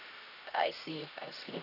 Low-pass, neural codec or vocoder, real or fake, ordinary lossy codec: 5.4 kHz; codec, 16 kHz, 1 kbps, X-Codec, HuBERT features, trained on LibriSpeech; fake; none